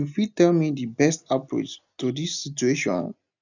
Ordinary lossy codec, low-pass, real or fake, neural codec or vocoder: none; 7.2 kHz; real; none